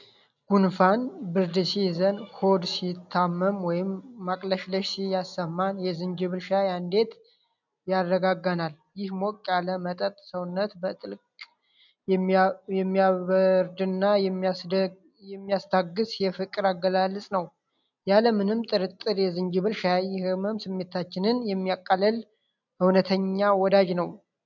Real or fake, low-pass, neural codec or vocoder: real; 7.2 kHz; none